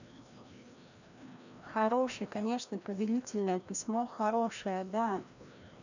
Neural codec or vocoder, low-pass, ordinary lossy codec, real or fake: codec, 16 kHz, 1 kbps, FreqCodec, larger model; 7.2 kHz; none; fake